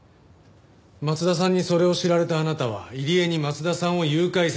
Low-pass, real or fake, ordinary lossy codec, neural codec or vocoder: none; real; none; none